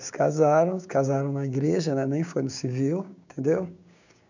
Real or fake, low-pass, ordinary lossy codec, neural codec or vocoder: fake; 7.2 kHz; none; codec, 16 kHz, 6 kbps, DAC